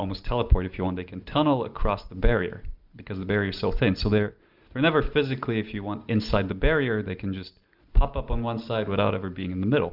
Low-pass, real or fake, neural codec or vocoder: 5.4 kHz; real; none